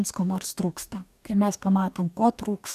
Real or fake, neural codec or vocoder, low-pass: fake; codec, 44.1 kHz, 2.6 kbps, DAC; 14.4 kHz